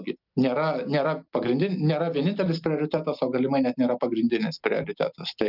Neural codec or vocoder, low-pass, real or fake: none; 5.4 kHz; real